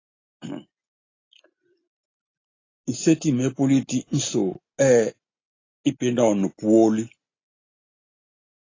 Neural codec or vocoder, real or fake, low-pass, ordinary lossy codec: none; real; 7.2 kHz; AAC, 32 kbps